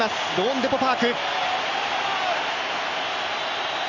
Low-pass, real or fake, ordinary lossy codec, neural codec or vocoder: 7.2 kHz; real; none; none